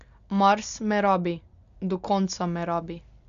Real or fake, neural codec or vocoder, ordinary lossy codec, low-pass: real; none; none; 7.2 kHz